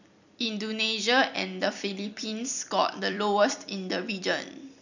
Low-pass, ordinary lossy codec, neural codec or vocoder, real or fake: 7.2 kHz; none; vocoder, 44.1 kHz, 128 mel bands every 256 samples, BigVGAN v2; fake